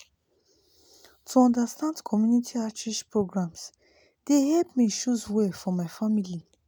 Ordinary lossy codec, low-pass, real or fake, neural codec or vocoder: none; none; real; none